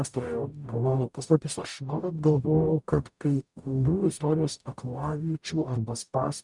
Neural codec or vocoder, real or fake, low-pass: codec, 44.1 kHz, 0.9 kbps, DAC; fake; 10.8 kHz